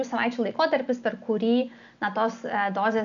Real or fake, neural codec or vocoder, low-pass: real; none; 7.2 kHz